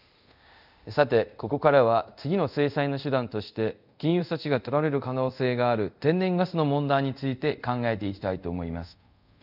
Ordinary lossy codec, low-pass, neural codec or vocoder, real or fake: none; 5.4 kHz; codec, 24 kHz, 0.5 kbps, DualCodec; fake